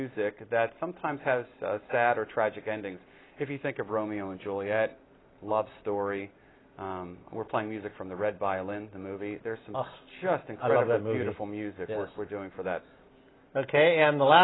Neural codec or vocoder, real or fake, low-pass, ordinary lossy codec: none; real; 7.2 kHz; AAC, 16 kbps